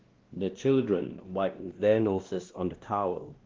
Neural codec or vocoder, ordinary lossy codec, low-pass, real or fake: codec, 16 kHz, 1 kbps, X-Codec, WavLM features, trained on Multilingual LibriSpeech; Opus, 16 kbps; 7.2 kHz; fake